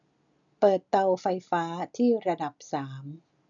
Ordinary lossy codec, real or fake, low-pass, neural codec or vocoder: none; real; 7.2 kHz; none